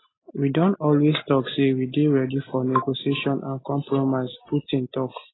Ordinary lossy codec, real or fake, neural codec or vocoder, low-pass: AAC, 16 kbps; real; none; 7.2 kHz